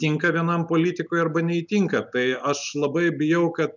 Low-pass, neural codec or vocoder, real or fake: 7.2 kHz; none; real